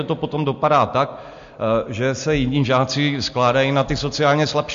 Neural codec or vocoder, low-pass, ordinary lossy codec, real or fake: none; 7.2 kHz; MP3, 48 kbps; real